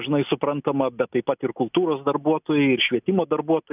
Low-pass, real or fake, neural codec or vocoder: 3.6 kHz; real; none